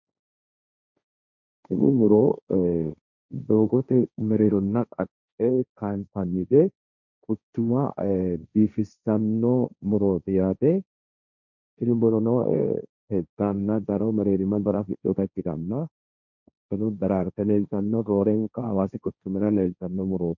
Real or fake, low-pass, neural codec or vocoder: fake; 7.2 kHz; codec, 16 kHz, 1.1 kbps, Voila-Tokenizer